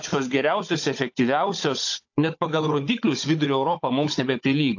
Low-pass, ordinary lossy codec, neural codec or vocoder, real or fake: 7.2 kHz; AAC, 48 kbps; codec, 16 kHz, 16 kbps, FunCodec, trained on Chinese and English, 50 frames a second; fake